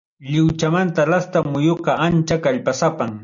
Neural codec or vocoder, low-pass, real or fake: none; 7.2 kHz; real